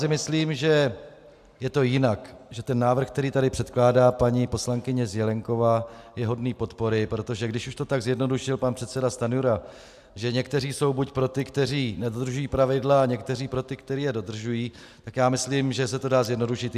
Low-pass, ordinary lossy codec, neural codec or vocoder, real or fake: 14.4 kHz; AAC, 96 kbps; none; real